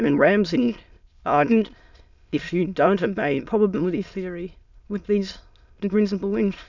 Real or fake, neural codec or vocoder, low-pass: fake; autoencoder, 22.05 kHz, a latent of 192 numbers a frame, VITS, trained on many speakers; 7.2 kHz